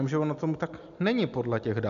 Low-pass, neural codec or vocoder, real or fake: 7.2 kHz; none; real